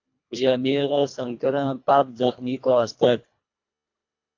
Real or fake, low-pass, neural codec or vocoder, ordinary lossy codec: fake; 7.2 kHz; codec, 24 kHz, 1.5 kbps, HILCodec; AAC, 48 kbps